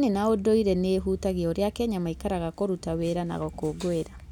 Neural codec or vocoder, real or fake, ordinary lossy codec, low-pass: none; real; none; 19.8 kHz